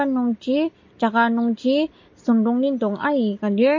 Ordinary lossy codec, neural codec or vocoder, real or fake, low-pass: MP3, 32 kbps; none; real; 7.2 kHz